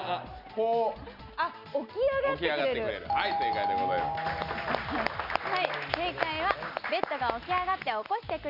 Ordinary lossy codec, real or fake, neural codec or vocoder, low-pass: none; real; none; 5.4 kHz